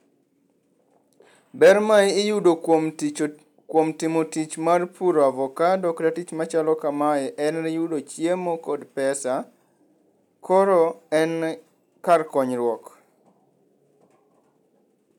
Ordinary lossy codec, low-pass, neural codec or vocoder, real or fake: none; 19.8 kHz; none; real